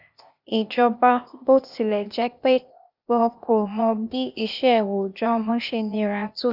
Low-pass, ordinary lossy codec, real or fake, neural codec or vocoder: 5.4 kHz; none; fake; codec, 16 kHz, 0.8 kbps, ZipCodec